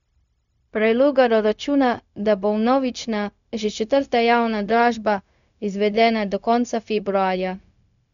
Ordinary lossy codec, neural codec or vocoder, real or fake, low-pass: none; codec, 16 kHz, 0.4 kbps, LongCat-Audio-Codec; fake; 7.2 kHz